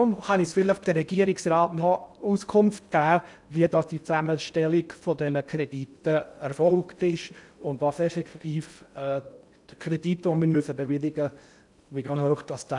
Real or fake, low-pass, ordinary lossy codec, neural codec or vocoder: fake; 10.8 kHz; none; codec, 16 kHz in and 24 kHz out, 0.6 kbps, FocalCodec, streaming, 2048 codes